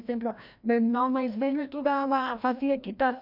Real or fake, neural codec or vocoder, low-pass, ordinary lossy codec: fake; codec, 16 kHz, 1 kbps, FreqCodec, larger model; 5.4 kHz; none